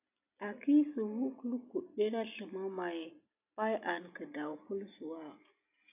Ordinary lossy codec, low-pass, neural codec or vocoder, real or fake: AAC, 32 kbps; 3.6 kHz; none; real